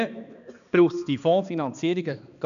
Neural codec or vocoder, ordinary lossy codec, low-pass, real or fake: codec, 16 kHz, 2 kbps, X-Codec, HuBERT features, trained on balanced general audio; none; 7.2 kHz; fake